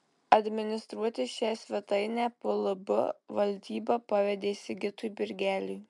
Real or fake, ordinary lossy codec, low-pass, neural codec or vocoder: real; MP3, 96 kbps; 10.8 kHz; none